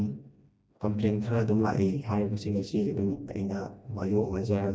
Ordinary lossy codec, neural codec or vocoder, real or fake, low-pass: none; codec, 16 kHz, 1 kbps, FreqCodec, smaller model; fake; none